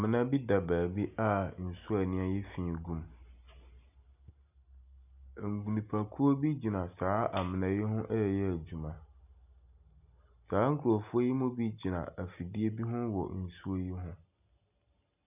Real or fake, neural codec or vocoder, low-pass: real; none; 3.6 kHz